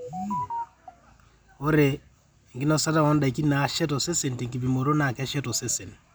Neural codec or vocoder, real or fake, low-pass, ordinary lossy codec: none; real; none; none